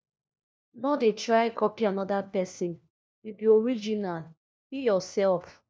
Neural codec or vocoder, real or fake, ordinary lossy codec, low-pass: codec, 16 kHz, 1 kbps, FunCodec, trained on LibriTTS, 50 frames a second; fake; none; none